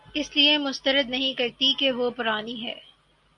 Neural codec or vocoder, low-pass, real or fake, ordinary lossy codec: none; 10.8 kHz; real; MP3, 64 kbps